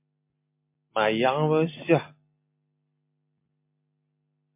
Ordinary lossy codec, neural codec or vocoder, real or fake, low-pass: MP3, 24 kbps; none; real; 3.6 kHz